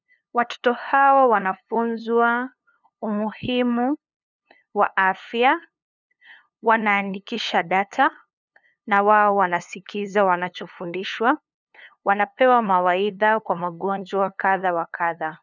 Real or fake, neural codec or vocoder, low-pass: fake; codec, 16 kHz, 2 kbps, FunCodec, trained on LibriTTS, 25 frames a second; 7.2 kHz